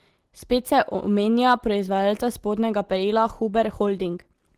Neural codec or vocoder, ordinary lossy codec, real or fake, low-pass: none; Opus, 16 kbps; real; 14.4 kHz